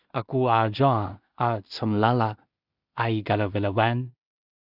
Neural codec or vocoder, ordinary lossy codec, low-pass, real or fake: codec, 16 kHz in and 24 kHz out, 0.4 kbps, LongCat-Audio-Codec, two codebook decoder; Opus, 64 kbps; 5.4 kHz; fake